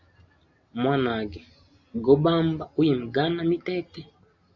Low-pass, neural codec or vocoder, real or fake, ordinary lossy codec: 7.2 kHz; none; real; Opus, 64 kbps